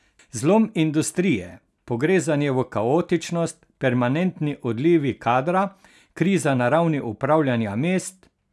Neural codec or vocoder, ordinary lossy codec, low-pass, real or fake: none; none; none; real